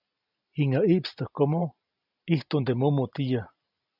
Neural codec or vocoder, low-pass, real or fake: none; 5.4 kHz; real